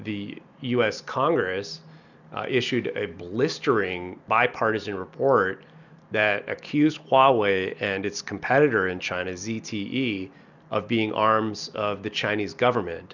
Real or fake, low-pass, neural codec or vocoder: real; 7.2 kHz; none